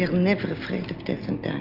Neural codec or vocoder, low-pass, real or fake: none; 5.4 kHz; real